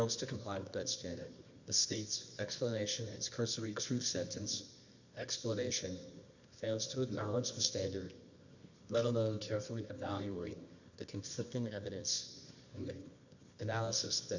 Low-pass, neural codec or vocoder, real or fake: 7.2 kHz; codec, 24 kHz, 0.9 kbps, WavTokenizer, medium music audio release; fake